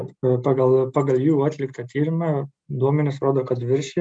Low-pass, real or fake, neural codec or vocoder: 9.9 kHz; real; none